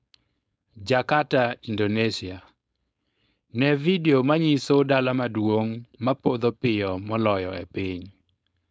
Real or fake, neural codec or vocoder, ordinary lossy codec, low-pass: fake; codec, 16 kHz, 4.8 kbps, FACodec; none; none